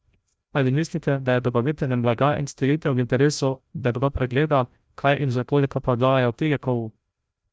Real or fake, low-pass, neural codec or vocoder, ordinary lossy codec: fake; none; codec, 16 kHz, 0.5 kbps, FreqCodec, larger model; none